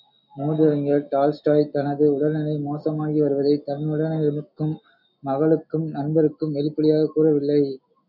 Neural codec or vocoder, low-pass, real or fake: none; 5.4 kHz; real